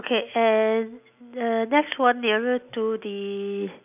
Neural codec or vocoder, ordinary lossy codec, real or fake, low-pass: none; none; real; 3.6 kHz